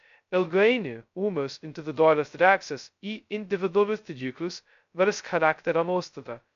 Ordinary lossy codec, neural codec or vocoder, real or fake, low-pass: MP3, 64 kbps; codec, 16 kHz, 0.2 kbps, FocalCodec; fake; 7.2 kHz